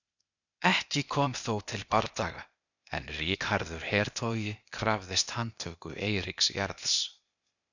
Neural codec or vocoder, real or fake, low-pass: codec, 16 kHz, 0.8 kbps, ZipCodec; fake; 7.2 kHz